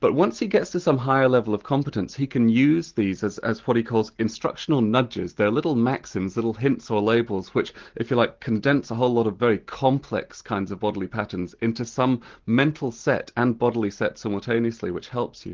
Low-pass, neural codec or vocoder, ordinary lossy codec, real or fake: 7.2 kHz; none; Opus, 16 kbps; real